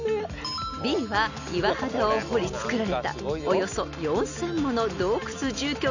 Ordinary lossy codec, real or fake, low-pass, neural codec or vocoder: none; real; 7.2 kHz; none